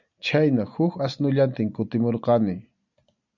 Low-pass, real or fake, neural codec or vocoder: 7.2 kHz; real; none